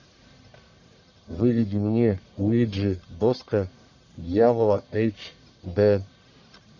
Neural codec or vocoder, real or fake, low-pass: codec, 44.1 kHz, 1.7 kbps, Pupu-Codec; fake; 7.2 kHz